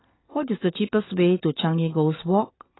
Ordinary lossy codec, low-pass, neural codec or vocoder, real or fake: AAC, 16 kbps; 7.2 kHz; none; real